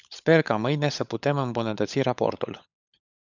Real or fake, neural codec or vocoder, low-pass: fake; codec, 16 kHz, 16 kbps, FunCodec, trained on LibriTTS, 50 frames a second; 7.2 kHz